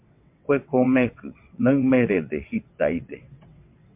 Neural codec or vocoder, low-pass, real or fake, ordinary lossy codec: vocoder, 22.05 kHz, 80 mel bands, WaveNeXt; 3.6 kHz; fake; MP3, 32 kbps